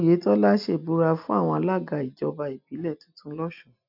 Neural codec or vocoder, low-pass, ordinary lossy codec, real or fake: none; 5.4 kHz; none; real